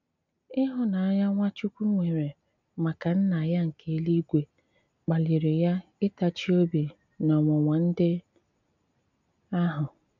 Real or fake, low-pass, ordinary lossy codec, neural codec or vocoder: real; 7.2 kHz; none; none